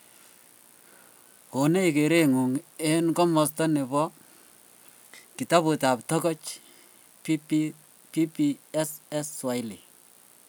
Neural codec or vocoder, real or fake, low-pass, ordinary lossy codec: none; real; none; none